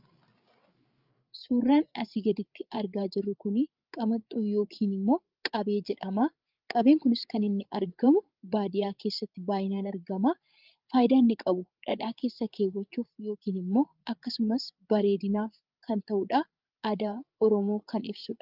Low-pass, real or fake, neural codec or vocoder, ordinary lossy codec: 5.4 kHz; fake; codec, 16 kHz, 8 kbps, FreqCodec, larger model; Opus, 24 kbps